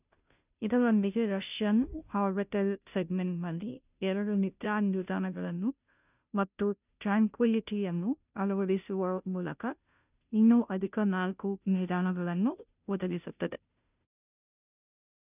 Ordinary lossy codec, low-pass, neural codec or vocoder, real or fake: none; 3.6 kHz; codec, 16 kHz, 0.5 kbps, FunCodec, trained on Chinese and English, 25 frames a second; fake